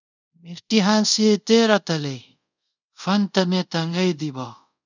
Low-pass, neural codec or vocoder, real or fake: 7.2 kHz; codec, 24 kHz, 0.5 kbps, DualCodec; fake